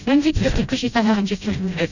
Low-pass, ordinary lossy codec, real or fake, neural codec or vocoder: 7.2 kHz; none; fake; codec, 16 kHz, 0.5 kbps, FreqCodec, smaller model